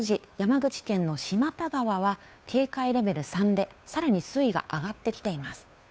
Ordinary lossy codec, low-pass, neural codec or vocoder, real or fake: none; none; codec, 16 kHz, 2 kbps, FunCodec, trained on Chinese and English, 25 frames a second; fake